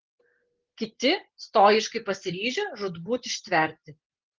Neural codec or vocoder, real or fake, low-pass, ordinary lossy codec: none; real; 7.2 kHz; Opus, 16 kbps